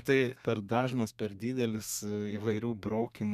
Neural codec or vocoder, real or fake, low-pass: codec, 32 kHz, 1.9 kbps, SNAC; fake; 14.4 kHz